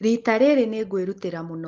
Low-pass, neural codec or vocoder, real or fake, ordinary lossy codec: 7.2 kHz; none; real; Opus, 16 kbps